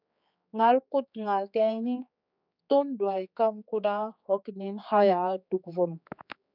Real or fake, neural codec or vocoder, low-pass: fake; codec, 16 kHz, 4 kbps, X-Codec, HuBERT features, trained on general audio; 5.4 kHz